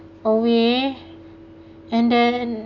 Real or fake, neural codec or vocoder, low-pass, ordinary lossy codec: real; none; 7.2 kHz; none